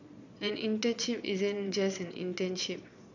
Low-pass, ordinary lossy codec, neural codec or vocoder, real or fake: 7.2 kHz; none; vocoder, 22.05 kHz, 80 mel bands, Vocos; fake